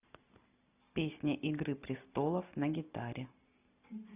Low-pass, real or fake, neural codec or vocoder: 3.6 kHz; real; none